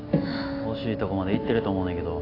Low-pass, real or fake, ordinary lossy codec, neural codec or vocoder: 5.4 kHz; real; AAC, 24 kbps; none